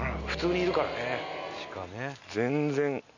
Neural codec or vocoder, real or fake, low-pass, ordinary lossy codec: none; real; 7.2 kHz; none